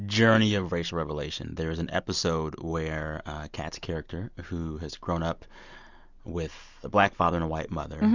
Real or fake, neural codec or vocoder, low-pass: real; none; 7.2 kHz